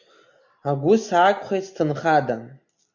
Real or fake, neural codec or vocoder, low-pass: real; none; 7.2 kHz